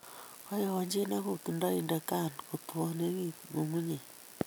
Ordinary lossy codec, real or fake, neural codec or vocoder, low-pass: none; real; none; none